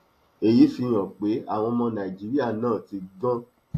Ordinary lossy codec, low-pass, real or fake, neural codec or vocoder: AAC, 48 kbps; 14.4 kHz; real; none